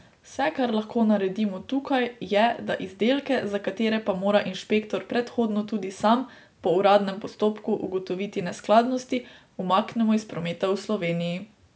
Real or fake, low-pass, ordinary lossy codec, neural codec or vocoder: real; none; none; none